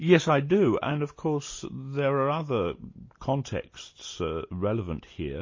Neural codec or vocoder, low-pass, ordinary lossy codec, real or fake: none; 7.2 kHz; MP3, 32 kbps; real